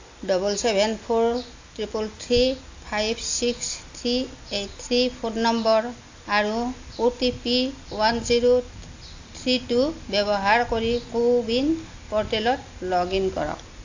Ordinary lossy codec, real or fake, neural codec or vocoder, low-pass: AAC, 48 kbps; real; none; 7.2 kHz